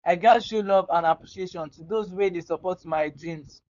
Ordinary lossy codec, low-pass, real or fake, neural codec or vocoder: none; 7.2 kHz; fake; codec, 16 kHz, 4.8 kbps, FACodec